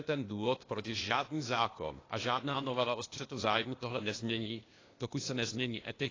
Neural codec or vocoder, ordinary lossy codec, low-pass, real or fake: codec, 16 kHz, 0.8 kbps, ZipCodec; AAC, 32 kbps; 7.2 kHz; fake